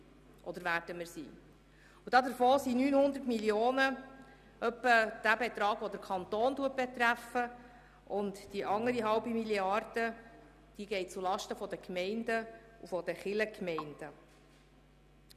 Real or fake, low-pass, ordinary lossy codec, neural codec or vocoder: real; 14.4 kHz; none; none